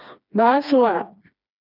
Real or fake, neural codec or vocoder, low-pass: fake; codec, 16 kHz, 2 kbps, FreqCodec, smaller model; 5.4 kHz